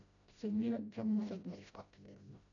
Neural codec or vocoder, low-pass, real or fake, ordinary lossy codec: codec, 16 kHz, 0.5 kbps, FreqCodec, smaller model; 7.2 kHz; fake; none